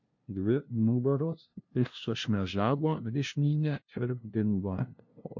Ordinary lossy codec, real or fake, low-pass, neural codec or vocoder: MP3, 48 kbps; fake; 7.2 kHz; codec, 16 kHz, 0.5 kbps, FunCodec, trained on LibriTTS, 25 frames a second